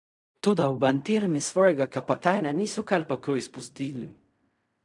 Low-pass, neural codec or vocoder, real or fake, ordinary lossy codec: 10.8 kHz; codec, 16 kHz in and 24 kHz out, 0.4 kbps, LongCat-Audio-Codec, fine tuned four codebook decoder; fake; none